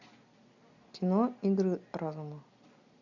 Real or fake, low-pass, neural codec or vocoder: real; 7.2 kHz; none